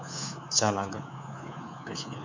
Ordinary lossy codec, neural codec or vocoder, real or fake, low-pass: MP3, 64 kbps; codec, 24 kHz, 3.1 kbps, DualCodec; fake; 7.2 kHz